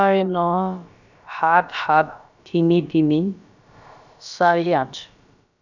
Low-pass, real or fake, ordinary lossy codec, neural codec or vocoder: 7.2 kHz; fake; none; codec, 16 kHz, about 1 kbps, DyCAST, with the encoder's durations